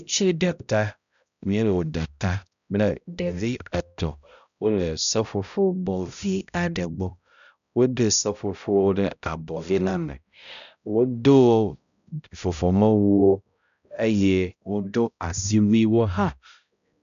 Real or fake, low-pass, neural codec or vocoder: fake; 7.2 kHz; codec, 16 kHz, 0.5 kbps, X-Codec, HuBERT features, trained on balanced general audio